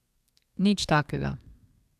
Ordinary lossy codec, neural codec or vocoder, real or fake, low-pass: AAC, 96 kbps; codec, 32 kHz, 1.9 kbps, SNAC; fake; 14.4 kHz